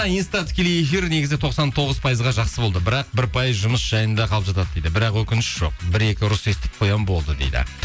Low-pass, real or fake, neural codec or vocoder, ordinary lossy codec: none; real; none; none